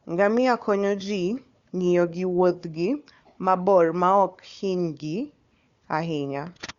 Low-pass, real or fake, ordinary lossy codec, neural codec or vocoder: 7.2 kHz; fake; Opus, 64 kbps; codec, 16 kHz, 4 kbps, FunCodec, trained on Chinese and English, 50 frames a second